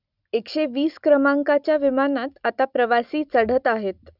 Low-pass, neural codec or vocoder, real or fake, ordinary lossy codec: 5.4 kHz; none; real; none